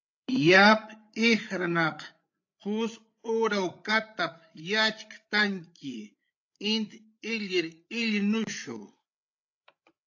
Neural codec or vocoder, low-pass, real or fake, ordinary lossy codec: codec, 16 kHz, 8 kbps, FreqCodec, larger model; 7.2 kHz; fake; AAC, 48 kbps